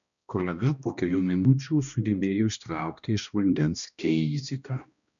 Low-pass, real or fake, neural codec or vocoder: 7.2 kHz; fake; codec, 16 kHz, 1 kbps, X-Codec, HuBERT features, trained on balanced general audio